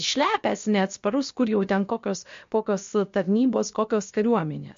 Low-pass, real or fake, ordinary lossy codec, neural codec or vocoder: 7.2 kHz; fake; MP3, 48 kbps; codec, 16 kHz, about 1 kbps, DyCAST, with the encoder's durations